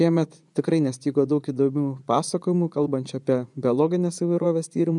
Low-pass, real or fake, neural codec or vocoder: 10.8 kHz; fake; vocoder, 24 kHz, 100 mel bands, Vocos